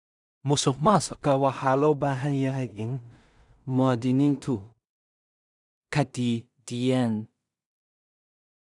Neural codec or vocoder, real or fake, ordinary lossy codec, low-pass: codec, 16 kHz in and 24 kHz out, 0.4 kbps, LongCat-Audio-Codec, two codebook decoder; fake; none; 10.8 kHz